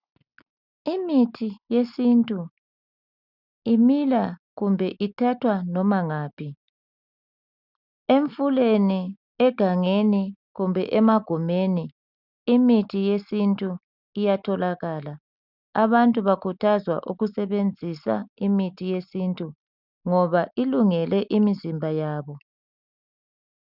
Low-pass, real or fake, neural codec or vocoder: 5.4 kHz; real; none